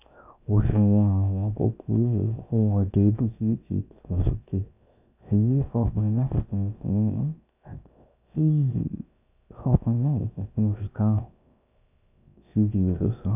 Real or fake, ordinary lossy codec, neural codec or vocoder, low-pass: fake; none; codec, 16 kHz, 0.7 kbps, FocalCodec; 3.6 kHz